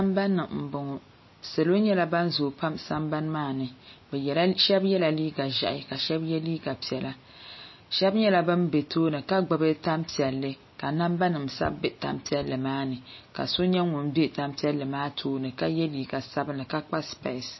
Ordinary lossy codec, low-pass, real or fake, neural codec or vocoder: MP3, 24 kbps; 7.2 kHz; real; none